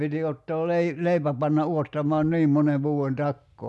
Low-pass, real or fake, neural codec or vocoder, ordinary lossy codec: none; real; none; none